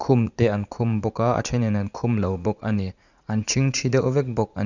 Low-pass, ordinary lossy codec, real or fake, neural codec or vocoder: 7.2 kHz; none; fake; vocoder, 44.1 kHz, 80 mel bands, Vocos